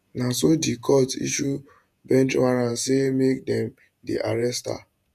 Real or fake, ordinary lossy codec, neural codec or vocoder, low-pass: fake; none; vocoder, 48 kHz, 128 mel bands, Vocos; 14.4 kHz